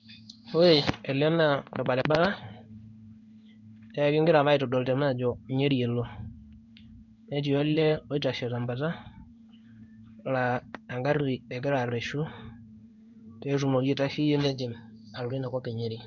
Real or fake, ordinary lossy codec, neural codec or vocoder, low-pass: fake; none; codec, 16 kHz in and 24 kHz out, 1 kbps, XY-Tokenizer; 7.2 kHz